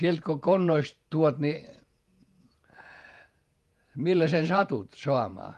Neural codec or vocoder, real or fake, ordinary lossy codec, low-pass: vocoder, 48 kHz, 128 mel bands, Vocos; fake; Opus, 32 kbps; 14.4 kHz